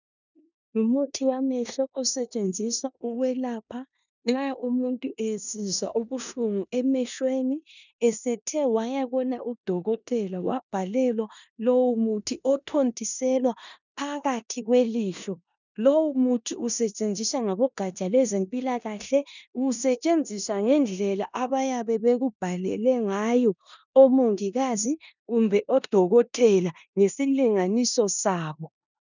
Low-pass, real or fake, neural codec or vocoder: 7.2 kHz; fake; codec, 16 kHz in and 24 kHz out, 0.9 kbps, LongCat-Audio-Codec, four codebook decoder